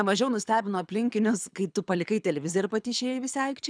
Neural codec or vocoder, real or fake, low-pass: codec, 24 kHz, 6 kbps, HILCodec; fake; 9.9 kHz